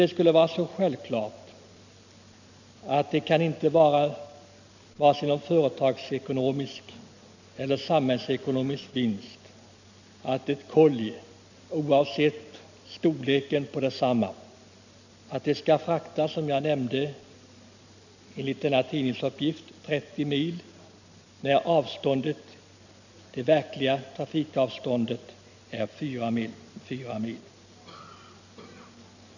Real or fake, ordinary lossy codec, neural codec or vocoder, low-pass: real; none; none; 7.2 kHz